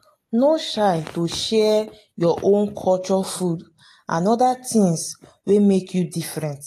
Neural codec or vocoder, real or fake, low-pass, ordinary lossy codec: none; real; 14.4 kHz; AAC, 48 kbps